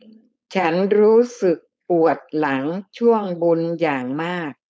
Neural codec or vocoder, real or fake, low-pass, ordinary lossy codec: codec, 16 kHz, 4.8 kbps, FACodec; fake; none; none